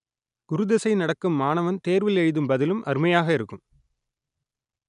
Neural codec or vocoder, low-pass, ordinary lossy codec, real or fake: none; 10.8 kHz; none; real